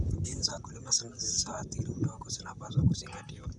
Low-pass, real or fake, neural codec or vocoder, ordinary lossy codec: 10.8 kHz; fake; vocoder, 44.1 kHz, 128 mel bands, Pupu-Vocoder; none